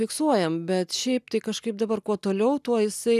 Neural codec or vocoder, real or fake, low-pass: none; real; 14.4 kHz